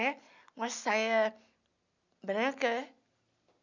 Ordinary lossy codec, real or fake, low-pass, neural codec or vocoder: none; real; 7.2 kHz; none